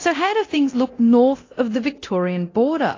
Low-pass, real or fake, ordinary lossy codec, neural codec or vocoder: 7.2 kHz; fake; AAC, 32 kbps; codec, 24 kHz, 0.9 kbps, DualCodec